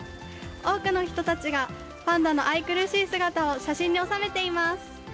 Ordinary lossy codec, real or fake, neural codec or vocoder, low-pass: none; real; none; none